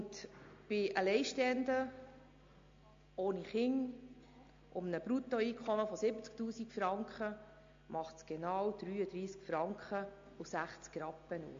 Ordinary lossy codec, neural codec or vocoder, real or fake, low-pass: MP3, 48 kbps; none; real; 7.2 kHz